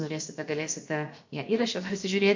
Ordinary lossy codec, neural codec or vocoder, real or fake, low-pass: MP3, 48 kbps; codec, 16 kHz, about 1 kbps, DyCAST, with the encoder's durations; fake; 7.2 kHz